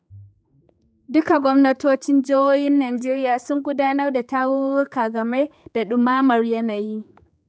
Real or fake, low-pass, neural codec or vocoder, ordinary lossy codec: fake; none; codec, 16 kHz, 4 kbps, X-Codec, HuBERT features, trained on general audio; none